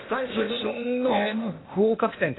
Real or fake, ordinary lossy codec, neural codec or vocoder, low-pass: fake; AAC, 16 kbps; codec, 16 kHz, 0.8 kbps, ZipCodec; 7.2 kHz